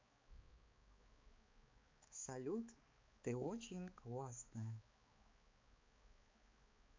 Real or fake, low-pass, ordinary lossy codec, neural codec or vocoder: fake; 7.2 kHz; MP3, 48 kbps; codec, 16 kHz, 4 kbps, X-Codec, HuBERT features, trained on balanced general audio